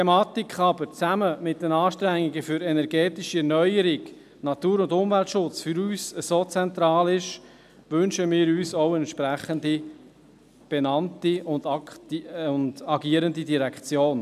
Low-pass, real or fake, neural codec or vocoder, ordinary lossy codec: 14.4 kHz; real; none; none